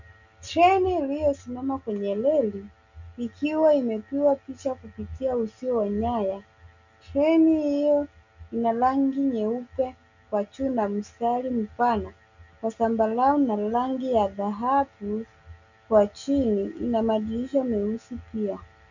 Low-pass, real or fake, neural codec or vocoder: 7.2 kHz; real; none